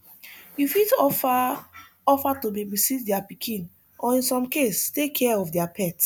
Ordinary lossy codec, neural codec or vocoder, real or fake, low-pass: none; none; real; none